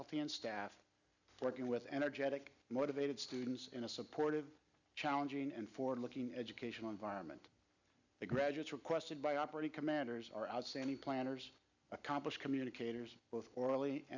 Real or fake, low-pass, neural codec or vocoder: real; 7.2 kHz; none